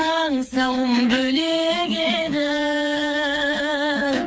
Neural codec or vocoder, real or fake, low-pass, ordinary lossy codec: codec, 16 kHz, 4 kbps, FreqCodec, smaller model; fake; none; none